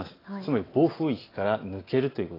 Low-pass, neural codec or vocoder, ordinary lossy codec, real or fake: 5.4 kHz; none; AAC, 24 kbps; real